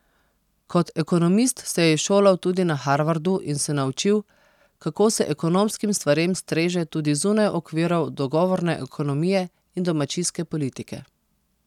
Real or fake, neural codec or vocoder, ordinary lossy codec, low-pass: real; none; none; 19.8 kHz